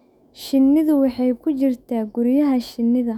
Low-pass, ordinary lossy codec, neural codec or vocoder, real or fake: 19.8 kHz; none; autoencoder, 48 kHz, 128 numbers a frame, DAC-VAE, trained on Japanese speech; fake